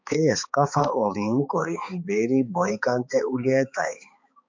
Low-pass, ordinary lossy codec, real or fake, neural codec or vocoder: 7.2 kHz; MP3, 48 kbps; fake; codec, 16 kHz, 4 kbps, X-Codec, HuBERT features, trained on balanced general audio